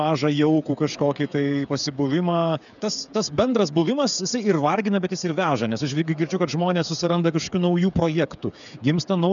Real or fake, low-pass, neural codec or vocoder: fake; 7.2 kHz; codec, 16 kHz, 8 kbps, FreqCodec, smaller model